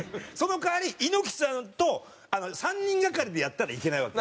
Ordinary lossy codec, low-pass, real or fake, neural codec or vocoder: none; none; real; none